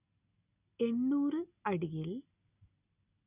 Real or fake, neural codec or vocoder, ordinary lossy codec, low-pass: real; none; none; 3.6 kHz